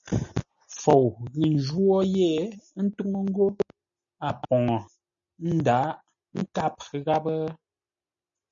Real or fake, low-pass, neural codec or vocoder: real; 7.2 kHz; none